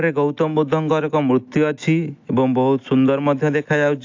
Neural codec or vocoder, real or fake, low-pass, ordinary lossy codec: none; real; 7.2 kHz; AAC, 48 kbps